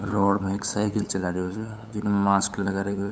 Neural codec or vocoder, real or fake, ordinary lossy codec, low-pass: codec, 16 kHz, 8 kbps, FunCodec, trained on LibriTTS, 25 frames a second; fake; none; none